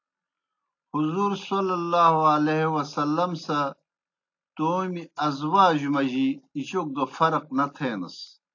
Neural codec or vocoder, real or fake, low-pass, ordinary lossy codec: none; real; 7.2 kHz; AAC, 48 kbps